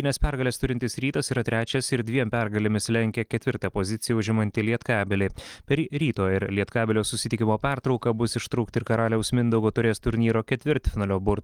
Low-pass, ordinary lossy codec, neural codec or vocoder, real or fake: 19.8 kHz; Opus, 24 kbps; none; real